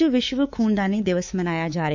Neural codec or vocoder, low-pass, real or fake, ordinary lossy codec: codec, 24 kHz, 3.1 kbps, DualCodec; 7.2 kHz; fake; none